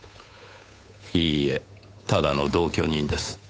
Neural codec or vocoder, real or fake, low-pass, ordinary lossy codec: codec, 16 kHz, 8 kbps, FunCodec, trained on Chinese and English, 25 frames a second; fake; none; none